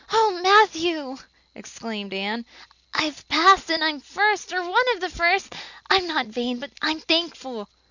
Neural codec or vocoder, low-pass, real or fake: none; 7.2 kHz; real